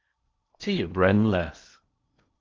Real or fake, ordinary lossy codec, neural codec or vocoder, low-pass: fake; Opus, 24 kbps; codec, 16 kHz in and 24 kHz out, 0.8 kbps, FocalCodec, streaming, 65536 codes; 7.2 kHz